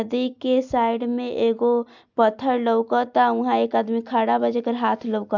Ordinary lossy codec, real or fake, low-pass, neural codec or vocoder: none; real; 7.2 kHz; none